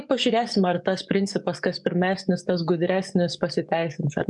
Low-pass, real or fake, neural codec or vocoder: 10.8 kHz; fake; codec, 44.1 kHz, 7.8 kbps, DAC